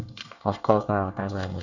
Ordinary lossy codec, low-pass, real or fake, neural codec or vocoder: none; 7.2 kHz; fake; codec, 24 kHz, 1 kbps, SNAC